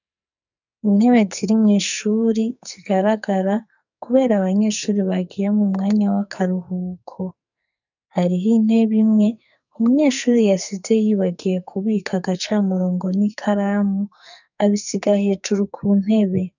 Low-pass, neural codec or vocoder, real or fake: 7.2 kHz; codec, 44.1 kHz, 2.6 kbps, SNAC; fake